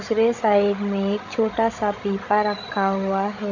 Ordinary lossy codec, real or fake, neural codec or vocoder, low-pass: AAC, 48 kbps; fake; codec, 16 kHz, 16 kbps, FreqCodec, larger model; 7.2 kHz